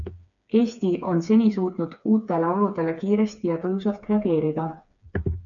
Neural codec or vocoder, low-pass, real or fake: codec, 16 kHz, 4 kbps, FreqCodec, smaller model; 7.2 kHz; fake